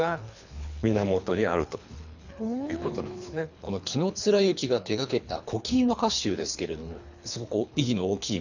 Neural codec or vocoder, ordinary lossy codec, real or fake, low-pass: codec, 24 kHz, 3 kbps, HILCodec; none; fake; 7.2 kHz